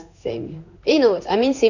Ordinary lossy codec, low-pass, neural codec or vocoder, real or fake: none; 7.2 kHz; codec, 16 kHz in and 24 kHz out, 1 kbps, XY-Tokenizer; fake